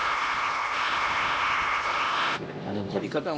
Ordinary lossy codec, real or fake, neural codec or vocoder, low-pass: none; fake; codec, 16 kHz, 1 kbps, X-Codec, HuBERT features, trained on LibriSpeech; none